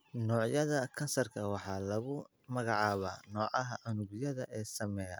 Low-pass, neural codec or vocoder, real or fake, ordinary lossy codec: none; none; real; none